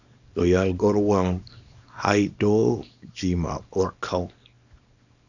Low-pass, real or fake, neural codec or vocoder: 7.2 kHz; fake; codec, 24 kHz, 0.9 kbps, WavTokenizer, small release